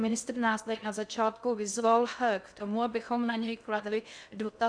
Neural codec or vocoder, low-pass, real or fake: codec, 16 kHz in and 24 kHz out, 0.6 kbps, FocalCodec, streaming, 2048 codes; 9.9 kHz; fake